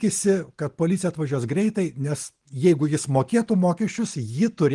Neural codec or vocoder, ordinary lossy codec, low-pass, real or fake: none; Opus, 24 kbps; 10.8 kHz; real